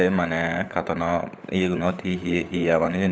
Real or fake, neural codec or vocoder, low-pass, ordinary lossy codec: fake; codec, 16 kHz, 16 kbps, FunCodec, trained on LibriTTS, 50 frames a second; none; none